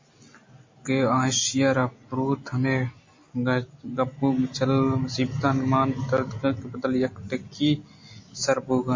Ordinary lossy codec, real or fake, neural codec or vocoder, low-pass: MP3, 32 kbps; real; none; 7.2 kHz